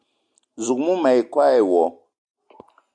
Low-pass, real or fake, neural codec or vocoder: 9.9 kHz; real; none